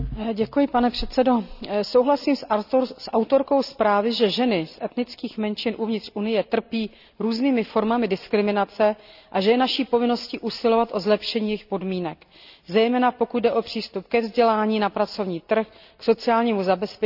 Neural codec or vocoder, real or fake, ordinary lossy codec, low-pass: none; real; none; 5.4 kHz